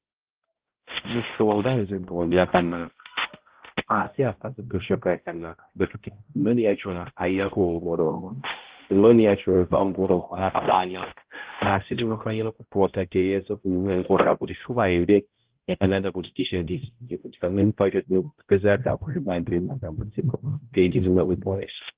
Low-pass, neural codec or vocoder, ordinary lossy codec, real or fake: 3.6 kHz; codec, 16 kHz, 0.5 kbps, X-Codec, HuBERT features, trained on balanced general audio; Opus, 16 kbps; fake